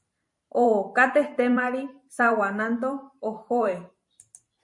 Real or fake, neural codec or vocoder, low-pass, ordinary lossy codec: fake; vocoder, 44.1 kHz, 128 mel bands every 512 samples, BigVGAN v2; 10.8 kHz; MP3, 48 kbps